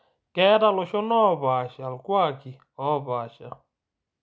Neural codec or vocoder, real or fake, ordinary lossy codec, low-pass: none; real; none; none